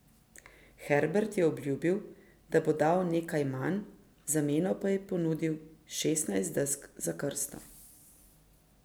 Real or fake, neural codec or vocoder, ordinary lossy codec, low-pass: real; none; none; none